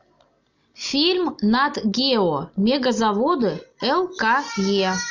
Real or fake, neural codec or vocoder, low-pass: real; none; 7.2 kHz